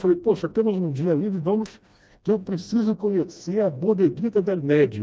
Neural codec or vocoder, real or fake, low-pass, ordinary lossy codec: codec, 16 kHz, 1 kbps, FreqCodec, smaller model; fake; none; none